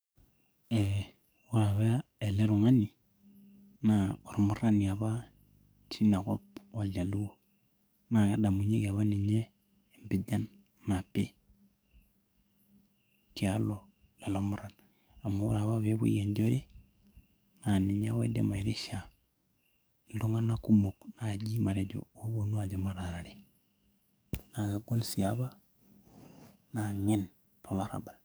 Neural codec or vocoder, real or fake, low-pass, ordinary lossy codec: codec, 44.1 kHz, 7.8 kbps, DAC; fake; none; none